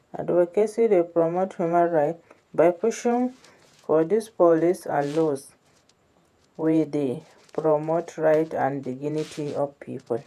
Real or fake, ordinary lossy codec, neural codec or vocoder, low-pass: fake; none; vocoder, 48 kHz, 128 mel bands, Vocos; 14.4 kHz